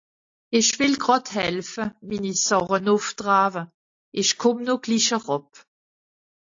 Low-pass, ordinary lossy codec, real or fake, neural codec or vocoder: 7.2 kHz; AAC, 48 kbps; real; none